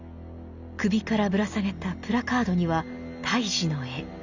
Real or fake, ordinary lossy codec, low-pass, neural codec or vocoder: real; Opus, 64 kbps; 7.2 kHz; none